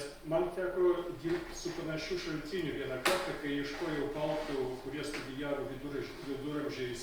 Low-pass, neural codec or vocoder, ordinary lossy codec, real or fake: 19.8 kHz; none; Opus, 32 kbps; real